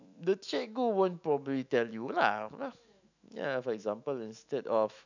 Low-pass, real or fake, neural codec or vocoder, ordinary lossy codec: 7.2 kHz; fake; autoencoder, 48 kHz, 128 numbers a frame, DAC-VAE, trained on Japanese speech; none